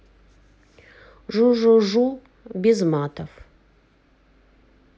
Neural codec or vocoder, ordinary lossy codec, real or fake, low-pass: none; none; real; none